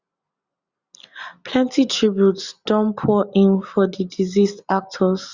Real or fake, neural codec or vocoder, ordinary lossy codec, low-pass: real; none; Opus, 64 kbps; 7.2 kHz